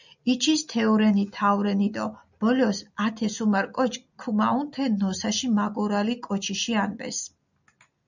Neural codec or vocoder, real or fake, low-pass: none; real; 7.2 kHz